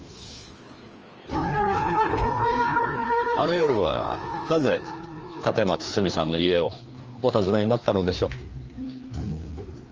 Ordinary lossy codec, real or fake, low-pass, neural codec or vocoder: Opus, 24 kbps; fake; 7.2 kHz; codec, 16 kHz, 2 kbps, FreqCodec, larger model